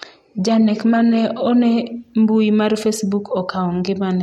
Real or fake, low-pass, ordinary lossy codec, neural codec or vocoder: real; 19.8 kHz; MP3, 64 kbps; none